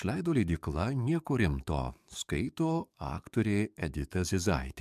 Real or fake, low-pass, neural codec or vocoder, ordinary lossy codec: fake; 14.4 kHz; codec, 44.1 kHz, 7.8 kbps, DAC; MP3, 96 kbps